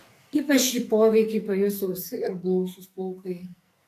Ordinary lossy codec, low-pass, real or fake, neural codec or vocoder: AAC, 64 kbps; 14.4 kHz; fake; codec, 44.1 kHz, 2.6 kbps, SNAC